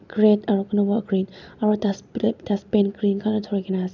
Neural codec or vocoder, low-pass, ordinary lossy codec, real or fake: none; 7.2 kHz; none; real